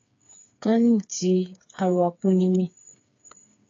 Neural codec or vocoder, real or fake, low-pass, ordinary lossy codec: codec, 16 kHz, 4 kbps, FreqCodec, smaller model; fake; 7.2 kHz; AAC, 48 kbps